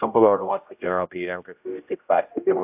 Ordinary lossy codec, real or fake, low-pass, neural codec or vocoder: AAC, 32 kbps; fake; 3.6 kHz; codec, 16 kHz, 0.5 kbps, X-Codec, HuBERT features, trained on general audio